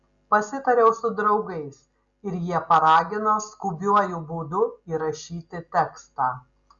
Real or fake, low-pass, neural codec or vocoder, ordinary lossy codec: real; 7.2 kHz; none; Opus, 64 kbps